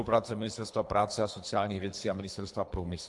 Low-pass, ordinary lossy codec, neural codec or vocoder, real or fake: 10.8 kHz; AAC, 64 kbps; codec, 24 kHz, 3 kbps, HILCodec; fake